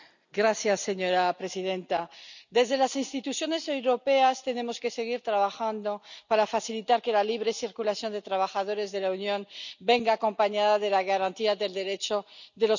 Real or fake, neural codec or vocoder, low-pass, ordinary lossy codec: real; none; 7.2 kHz; none